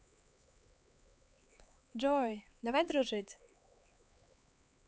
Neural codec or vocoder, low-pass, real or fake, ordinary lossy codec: codec, 16 kHz, 4 kbps, X-Codec, HuBERT features, trained on LibriSpeech; none; fake; none